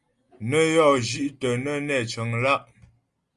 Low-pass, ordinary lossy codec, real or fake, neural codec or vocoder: 10.8 kHz; Opus, 32 kbps; real; none